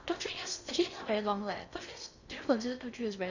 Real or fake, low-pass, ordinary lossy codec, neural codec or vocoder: fake; 7.2 kHz; none; codec, 16 kHz in and 24 kHz out, 0.6 kbps, FocalCodec, streaming, 2048 codes